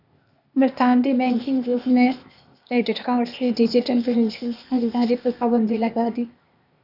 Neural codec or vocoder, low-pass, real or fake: codec, 16 kHz, 0.8 kbps, ZipCodec; 5.4 kHz; fake